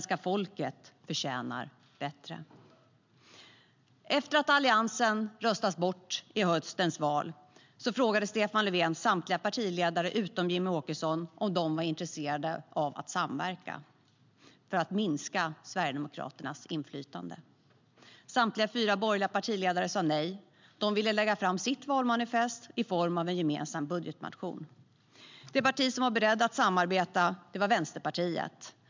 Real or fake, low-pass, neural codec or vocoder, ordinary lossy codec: real; 7.2 kHz; none; MP3, 64 kbps